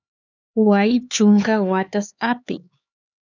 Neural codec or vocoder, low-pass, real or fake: codec, 16 kHz, 4 kbps, X-Codec, HuBERT features, trained on LibriSpeech; 7.2 kHz; fake